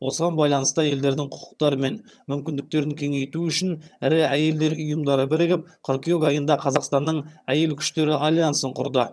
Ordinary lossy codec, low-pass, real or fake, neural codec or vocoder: none; none; fake; vocoder, 22.05 kHz, 80 mel bands, HiFi-GAN